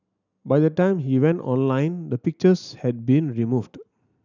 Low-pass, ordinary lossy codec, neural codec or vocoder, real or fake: 7.2 kHz; none; none; real